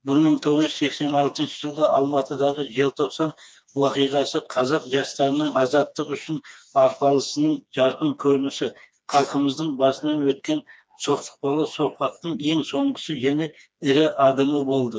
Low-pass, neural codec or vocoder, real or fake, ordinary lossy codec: none; codec, 16 kHz, 2 kbps, FreqCodec, smaller model; fake; none